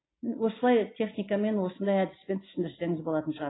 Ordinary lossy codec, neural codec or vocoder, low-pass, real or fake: AAC, 16 kbps; none; 7.2 kHz; real